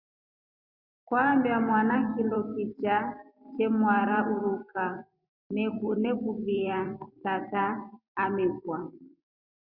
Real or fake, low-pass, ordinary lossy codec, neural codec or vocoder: fake; 5.4 kHz; Opus, 64 kbps; vocoder, 44.1 kHz, 128 mel bands every 256 samples, BigVGAN v2